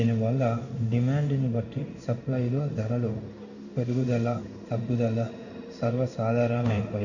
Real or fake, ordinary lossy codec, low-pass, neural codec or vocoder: fake; none; 7.2 kHz; codec, 16 kHz in and 24 kHz out, 1 kbps, XY-Tokenizer